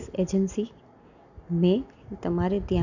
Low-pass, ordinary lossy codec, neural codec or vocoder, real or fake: 7.2 kHz; none; none; real